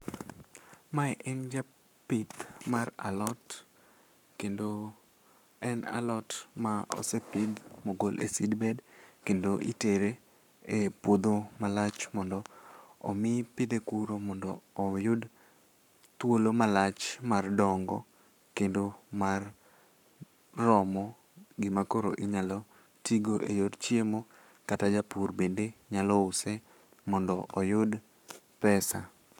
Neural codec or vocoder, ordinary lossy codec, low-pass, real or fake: codec, 44.1 kHz, 7.8 kbps, Pupu-Codec; none; 19.8 kHz; fake